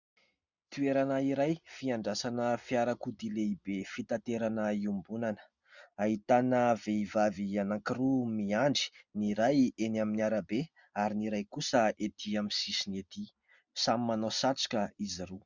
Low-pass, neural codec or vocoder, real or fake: 7.2 kHz; none; real